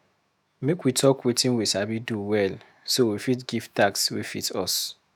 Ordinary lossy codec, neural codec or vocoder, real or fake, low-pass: none; autoencoder, 48 kHz, 128 numbers a frame, DAC-VAE, trained on Japanese speech; fake; none